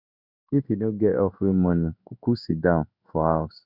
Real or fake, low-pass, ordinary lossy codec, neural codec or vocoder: fake; 5.4 kHz; none; codec, 16 kHz in and 24 kHz out, 1 kbps, XY-Tokenizer